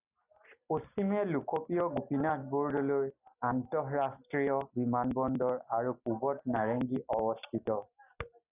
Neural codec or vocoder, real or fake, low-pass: none; real; 3.6 kHz